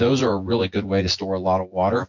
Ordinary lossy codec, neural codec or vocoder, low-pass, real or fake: MP3, 48 kbps; vocoder, 24 kHz, 100 mel bands, Vocos; 7.2 kHz; fake